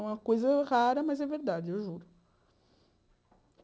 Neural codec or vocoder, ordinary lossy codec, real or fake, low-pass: none; none; real; none